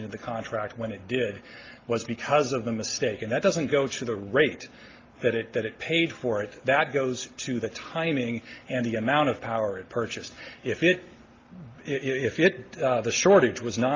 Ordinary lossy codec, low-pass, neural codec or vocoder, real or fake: Opus, 32 kbps; 7.2 kHz; none; real